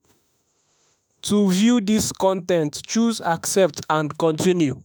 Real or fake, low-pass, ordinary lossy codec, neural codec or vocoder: fake; none; none; autoencoder, 48 kHz, 32 numbers a frame, DAC-VAE, trained on Japanese speech